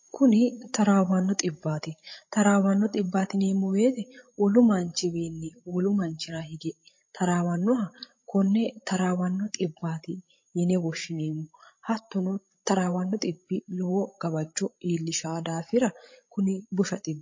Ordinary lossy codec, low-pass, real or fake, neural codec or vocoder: MP3, 32 kbps; 7.2 kHz; fake; codec, 16 kHz, 16 kbps, FreqCodec, larger model